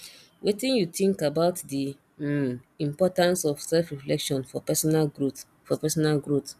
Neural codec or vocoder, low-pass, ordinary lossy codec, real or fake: none; 14.4 kHz; none; real